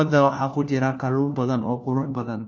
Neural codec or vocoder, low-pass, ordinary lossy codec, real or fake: codec, 16 kHz, 1 kbps, FunCodec, trained on LibriTTS, 50 frames a second; none; none; fake